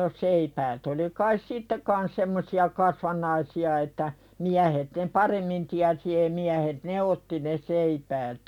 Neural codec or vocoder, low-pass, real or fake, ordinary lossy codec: none; 19.8 kHz; real; none